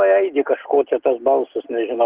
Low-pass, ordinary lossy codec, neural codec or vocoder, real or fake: 3.6 kHz; Opus, 16 kbps; none; real